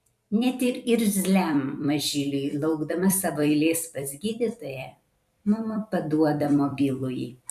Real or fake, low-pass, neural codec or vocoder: fake; 14.4 kHz; vocoder, 44.1 kHz, 128 mel bands every 256 samples, BigVGAN v2